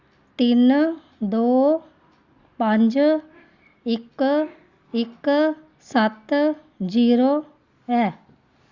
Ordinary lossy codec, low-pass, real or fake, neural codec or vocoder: Opus, 64 kbps; 7.2 kHz; real; none